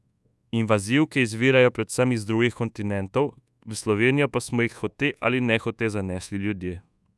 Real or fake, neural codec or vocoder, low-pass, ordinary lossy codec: fake; codec, 24 kHz, 1.2 kbps, DualCodec; none; none